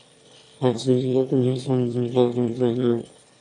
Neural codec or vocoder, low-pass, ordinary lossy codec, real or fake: autoencoder, 22.05 kHz, a latent of 192 numbers a frame, VITS, trained on one speaker; 9.9 kHz; AAC, 64 kbps; fake